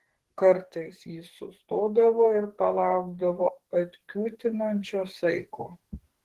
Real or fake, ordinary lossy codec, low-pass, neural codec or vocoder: fake; Opus, 16 kbps; 14.4 kHz; codec, 44.1 kHz, 2.6 kbps, SNAC